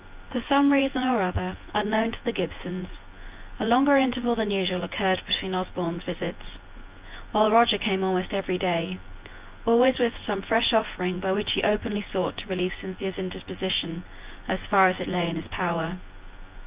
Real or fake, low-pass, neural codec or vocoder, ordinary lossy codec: fake; 3.6 kHz; vocoder, 24 kHz, 100 mel bands, Vocos; Opus, 24 kbps